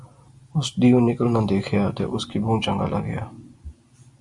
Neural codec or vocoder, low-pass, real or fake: none; 10.8 kHz; real